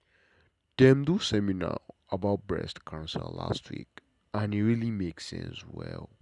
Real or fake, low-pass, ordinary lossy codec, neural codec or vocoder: real; 10.8 kHz; none; none